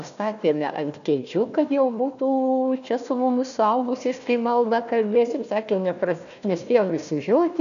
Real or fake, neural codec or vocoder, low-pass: fake; codec, 16 kHz, 1 kbps, FunCodec, trained on Chinese and English, 50 frames a second; 7.2 kHz